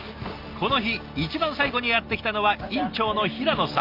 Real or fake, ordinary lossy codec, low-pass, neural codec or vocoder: real; Opus, 24 kbps; 5.4 kHz; none